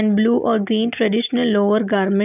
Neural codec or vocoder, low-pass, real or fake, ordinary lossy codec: none; 3.6 kHz; real; none